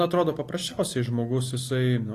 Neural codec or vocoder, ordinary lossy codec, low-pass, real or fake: none; AAC, 48 kbps; 14.4 kHz; real